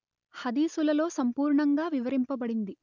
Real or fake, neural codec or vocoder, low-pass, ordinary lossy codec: real; none; 7.2 kHz; none